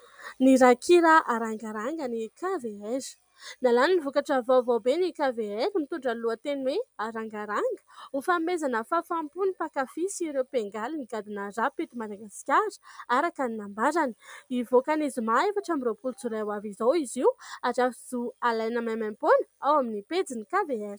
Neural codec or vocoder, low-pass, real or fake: none; 19.8 kHz; real